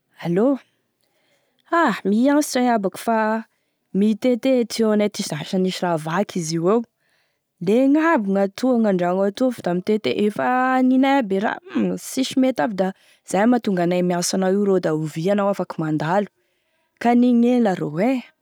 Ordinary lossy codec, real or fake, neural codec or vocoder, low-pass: none; real; none; none